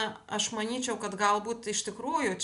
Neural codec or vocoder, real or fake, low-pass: none; real; 10.8 kHz